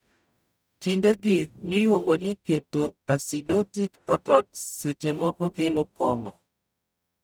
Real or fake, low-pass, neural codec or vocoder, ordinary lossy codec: fake; none; codec, 44.1 kHz, 0.9 kbps, DAC; none